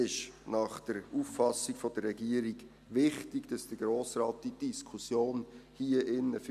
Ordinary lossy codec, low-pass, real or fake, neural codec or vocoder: none; 14.4 kHz; real; none